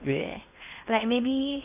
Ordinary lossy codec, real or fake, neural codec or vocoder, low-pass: none; fake; codec, 16 kHz in and 24 kHz out, 0.6 kbps, FocalCodec, streaming, 2048 codes; 3.6 kHz